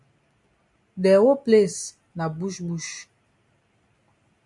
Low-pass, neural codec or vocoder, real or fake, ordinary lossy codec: 10.8 kHz; none; real; MP3, 64 kbps